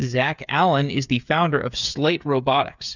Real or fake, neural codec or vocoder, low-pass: fake; codec, 16 kHz, 8 kbps, FreqCodec, smaller model; 7.2 kHz